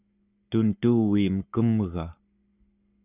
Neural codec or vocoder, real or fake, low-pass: codec, 44.1 kHz, 7.8 kbps, Pupu-Codec; fake; 3.6 kHz